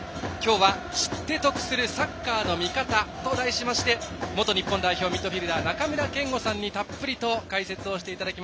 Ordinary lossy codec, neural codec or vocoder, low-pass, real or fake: none; none; none; real